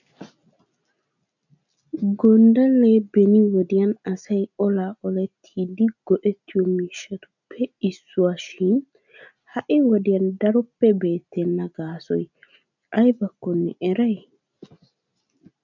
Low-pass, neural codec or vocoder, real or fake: 7.2 kHz; none; real